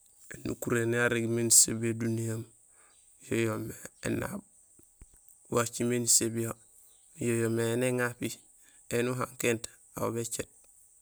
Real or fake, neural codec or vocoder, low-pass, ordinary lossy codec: real; none; none; none